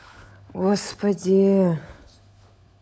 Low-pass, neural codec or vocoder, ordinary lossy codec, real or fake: none; codec, 16 kHz, 16 kbps, FunCodec, trained on LibriTTS, 50 frames a second; none; fake